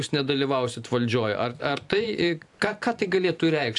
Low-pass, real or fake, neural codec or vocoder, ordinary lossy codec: 10.8 kHz; fake; vocoder, 44.1 kHz, 128 mel bands every 512 samples, BigVGAN v2; AAC, 64 kbps